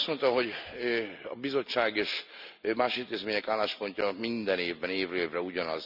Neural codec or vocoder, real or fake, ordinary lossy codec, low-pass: none; real; none; 5.4 kHz